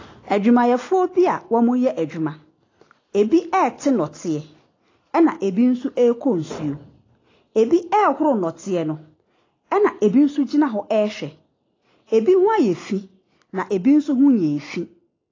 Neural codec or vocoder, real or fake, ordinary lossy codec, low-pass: none; real; AAC, 32 kbps; 7.2 kHz